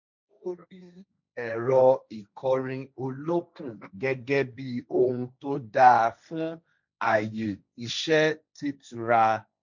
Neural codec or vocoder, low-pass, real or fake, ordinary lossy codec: codec, 16 kHz, 1.1 kbps, Voila-Tokenizer; 7.2 kHz; fake; none